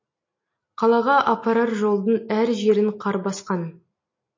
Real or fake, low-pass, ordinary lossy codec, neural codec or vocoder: real; 7.2 kHz; MP3, 32 kbps; none